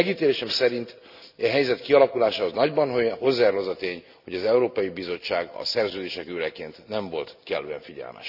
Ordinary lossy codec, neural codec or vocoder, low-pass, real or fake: none; none; 5.4 kHz; real